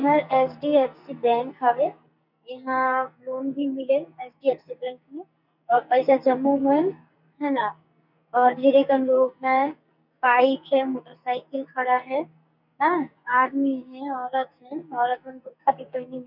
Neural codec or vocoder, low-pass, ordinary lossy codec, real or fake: codec, 44.1 kHz, 2.6 kbps, SNAC; 5.4 kHz; none; fake